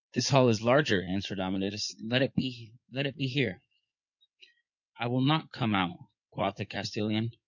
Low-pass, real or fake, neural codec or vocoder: 7.2 kHz; fake; codec, 16 kHz in and 24 kHz out, 2.2 kbps, FireRedTTS-2 codec